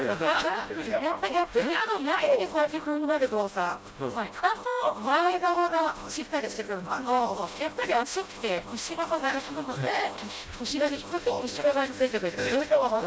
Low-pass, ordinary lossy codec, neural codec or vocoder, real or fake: none; none; codec, 16 kHz, 0.5 kbps, FreqCodec, smaller model; fake